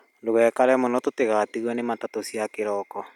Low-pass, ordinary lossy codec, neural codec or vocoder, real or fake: 19.8 kHz; none; none; real